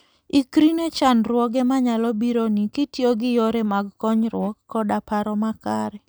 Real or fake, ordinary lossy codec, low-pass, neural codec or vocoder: real; none; none; none